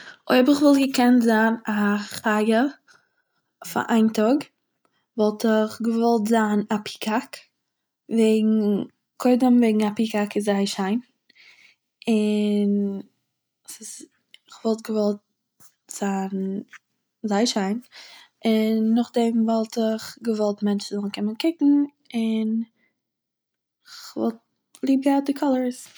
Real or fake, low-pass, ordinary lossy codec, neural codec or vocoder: real; none; none; none